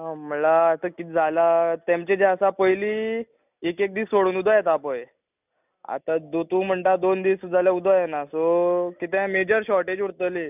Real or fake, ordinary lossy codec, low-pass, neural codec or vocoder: real; none; 3.6 kHz; none